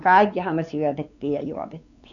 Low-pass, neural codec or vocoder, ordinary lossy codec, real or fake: 7.2 kHz; codec, 16 kHz, 4 kbps, X-Codec, WavLM features, trained on Multilingual LibriSpeech; none; fake